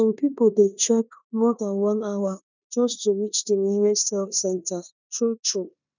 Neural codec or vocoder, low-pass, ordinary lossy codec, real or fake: codec, 16 kHz in and 24 kHz out, 0.9 kbps, LongCat-Audio-Codec, four codebook decoder; 7.2 kHz; none; fake